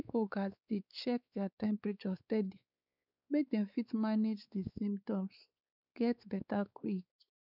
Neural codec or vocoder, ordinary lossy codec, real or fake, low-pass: codec, 16 kHz, 4 kbps, X-Codec, WavLM features, trained on Multilingual LibriSpeech; none; fake; 5.4 kHz